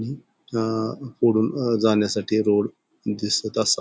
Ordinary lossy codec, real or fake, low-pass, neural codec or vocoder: none; real; none; none